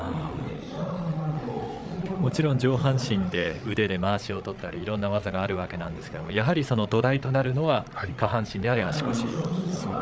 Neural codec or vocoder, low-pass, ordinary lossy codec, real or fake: codec, 16 kHz, 8 kbps, FreqCodec, larger model; none; none; fake